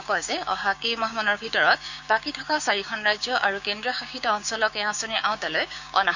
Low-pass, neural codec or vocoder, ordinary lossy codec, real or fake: 7.2 kHz; codec, 16 kHz, 6 kbps, DAC; none; fake